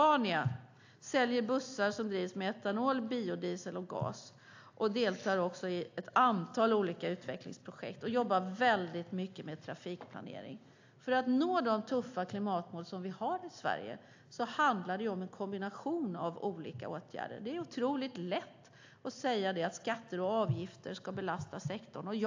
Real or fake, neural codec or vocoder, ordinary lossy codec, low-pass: real; none; AAC, 48 kbps; 7.2 kHz